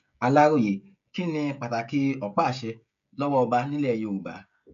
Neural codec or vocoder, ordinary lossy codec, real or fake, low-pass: codec, 16 kHz, 16 kbps, FreqCodec, smaller model; none; fake; 7.2 kHz